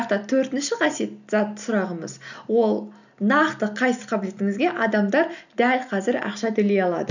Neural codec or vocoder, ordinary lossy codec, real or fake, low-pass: none; none; real; 7.2 kHz